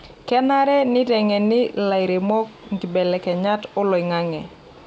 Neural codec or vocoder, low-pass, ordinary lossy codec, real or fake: none; none; none; real